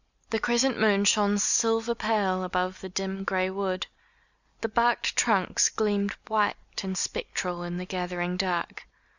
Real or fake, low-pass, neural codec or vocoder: real; 7.2 kHz; none